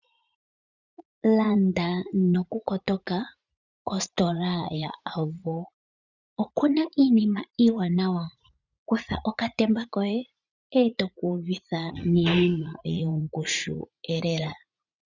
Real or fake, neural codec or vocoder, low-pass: fake; vocoder, 44.1 kHz, 80 mel bands, Vocos; 7.2 kHz